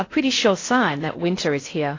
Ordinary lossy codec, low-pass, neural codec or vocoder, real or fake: AAC, 32 kbps; 7.2 kHz; codec, 16 kHz in and 24 kHz out, 0.6 kbps, FocalCodec, streaming, 4096 codes; fake